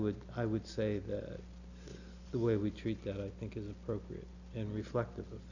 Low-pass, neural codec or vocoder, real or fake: 7.2 kHz; none; real